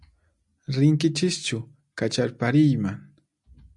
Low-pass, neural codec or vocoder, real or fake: 10.8 kHz; none; real